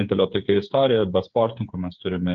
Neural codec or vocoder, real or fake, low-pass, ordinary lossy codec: codec, 16 kHz, 8 kbps, FreqCodec, smaller model; fake; 7.2 kHz; Opus, 24 kbps